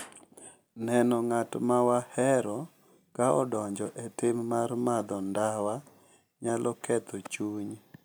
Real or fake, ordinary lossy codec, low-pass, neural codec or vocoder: real; none; none; none